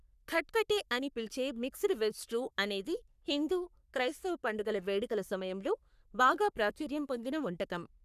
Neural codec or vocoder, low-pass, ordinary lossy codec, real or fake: codec, 44.1 kHz, 3.4 kbps, Pupu-Codec; 14.4 kHz; none; fake